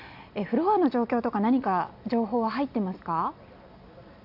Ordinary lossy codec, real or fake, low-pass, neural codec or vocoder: AAC, 48 kbps; real; 5.4 kHz; none